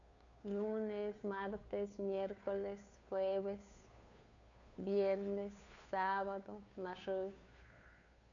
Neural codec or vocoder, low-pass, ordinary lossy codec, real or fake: codec, 16 kHz, 2 kbps, FunCodec, trained on Chinese and English, 25 frames a second; 7.2 kHz; none; fake